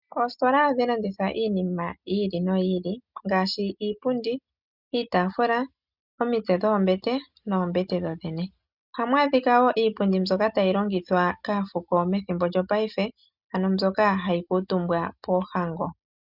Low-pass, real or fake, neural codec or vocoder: 5.4 kHz; real; none